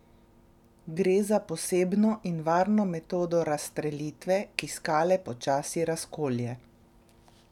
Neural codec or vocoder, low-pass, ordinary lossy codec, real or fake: none; 19.8 kHz; none; real